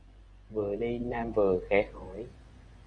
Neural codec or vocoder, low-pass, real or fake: vocoder, 24 kHz, 100 mel bands, Vocos; 9.9 kHz; fake